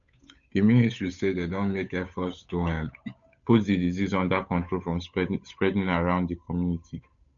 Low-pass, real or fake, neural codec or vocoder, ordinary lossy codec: 7.2 kHz; fake; codec, 16 kHz, 8 kbps, FunCodec, trained on Chinese and English, 25 frames a second; none